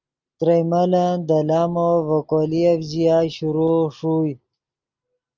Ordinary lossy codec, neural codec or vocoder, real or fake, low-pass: Opus, 24 kbps; none; real; 7.2 kHz